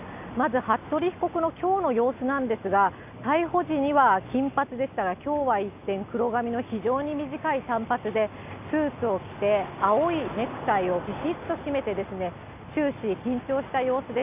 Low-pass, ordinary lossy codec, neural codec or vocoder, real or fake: 3.6 kHz; none; none; real